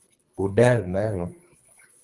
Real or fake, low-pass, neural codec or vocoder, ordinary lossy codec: fake; 10.8 kHz; codec, 24 kHz, 3 kbps, HILCodec; Opus, 32 kbps